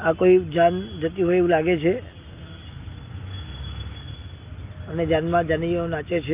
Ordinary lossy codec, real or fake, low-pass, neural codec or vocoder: Opus, 24 kbps; real; 3.6 kHz; none